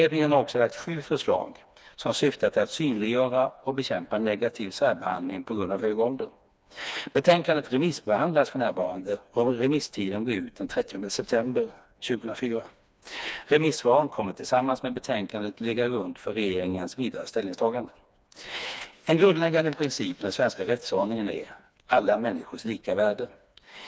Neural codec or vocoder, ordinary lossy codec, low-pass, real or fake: codec, 16 kHz, 2 kbps, FreqCodec, smaller model; none; none; fake